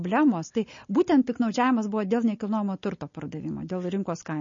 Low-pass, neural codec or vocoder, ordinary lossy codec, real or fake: 7.2 kHz; none; MP3, 32 kbps; real